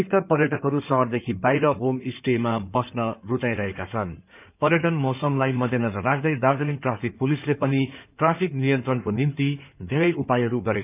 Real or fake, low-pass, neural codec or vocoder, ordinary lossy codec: fake; 3.6 kHz; codec, 16 kHz in and 24 kHz out, 2.2 kbps, FireRedTTS-2 codec; none